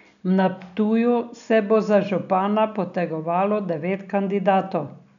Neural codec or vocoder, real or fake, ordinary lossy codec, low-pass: none; real; none; 7.2 kHz